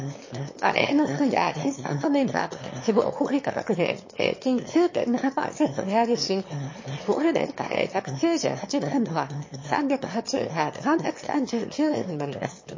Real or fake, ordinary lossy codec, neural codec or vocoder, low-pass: fake; MP3, 32 kbps; autoencoder, 22.05 kHz, a latent of 192 numbers a frame, VITS, trained on one speaker; 7.2 kHz